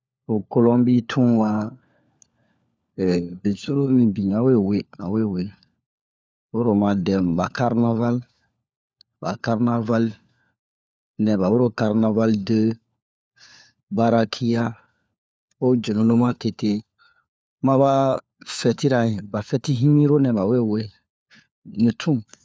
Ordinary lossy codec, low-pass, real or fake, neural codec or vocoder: none; none; fake; codec, 16 kHz, 4 kbps, FunCodec, trained on LibriTTS, 50 frames a second